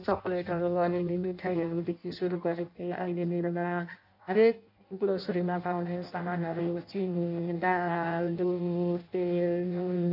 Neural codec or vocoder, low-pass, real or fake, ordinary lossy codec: codec, 16 kHz in and 24 kHz out, 0.6 kbps, FireRedTTS-2 codec; 5.4 kHz; fake; none